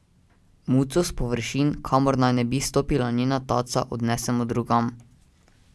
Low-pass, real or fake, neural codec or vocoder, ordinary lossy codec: none; real; none; none